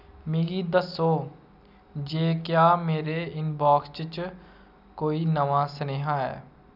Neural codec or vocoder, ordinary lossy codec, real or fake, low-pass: none; none; real; 5.4 kHz